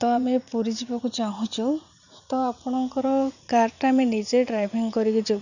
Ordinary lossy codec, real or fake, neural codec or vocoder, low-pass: none; fake; vocoder, 44.1 kHz, 80 mel bands, Vocos; 7.2 kHz